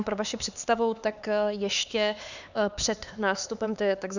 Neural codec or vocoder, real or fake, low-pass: codec, 16 kHz, 4 kbps, X-Codec, HuBERT features, trained on LibriSpeech; fake; 7.2 kHz